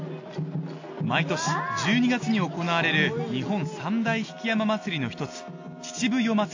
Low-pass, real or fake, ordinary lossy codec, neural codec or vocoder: 7.2 kHz; real; AAC, 48 kbps; none